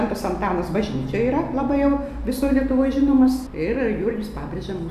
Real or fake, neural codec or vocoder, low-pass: real; none; 14.4 kHz